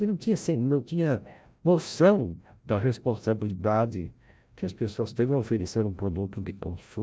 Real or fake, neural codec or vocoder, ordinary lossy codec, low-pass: fake; codec, 16 kHz, 0.5 kbps, FreqCodec, larger model; none; none